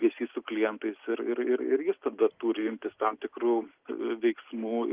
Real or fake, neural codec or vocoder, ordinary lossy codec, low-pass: real; none; Opus, 32 kbps; 3.6 kHz